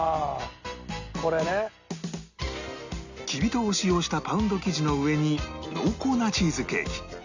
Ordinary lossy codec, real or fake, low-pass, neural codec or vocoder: Opus, 64 kbps; real; 7.2 kHz; none